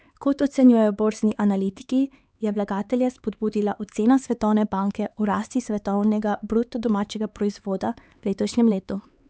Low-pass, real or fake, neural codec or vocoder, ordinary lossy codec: none; fake; codec, 16 kHz, 4 kbps, X-Codec, HuBERT features, trained on LibriSpeech; none